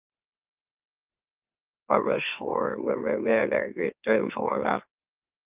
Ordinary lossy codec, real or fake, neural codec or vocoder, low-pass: Opus, 24 kbps; fake; autoencoder, 44.1 kHz, a latent of 192 numbers a frame, MeloTTS; 3.6 kHz